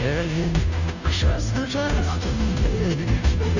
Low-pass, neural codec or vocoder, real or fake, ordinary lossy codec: 7.2 kHz; codec, 16 kHz, 0.5 kbps, FunCodec, trained on Chinese and English, 25 frames a second; fake; none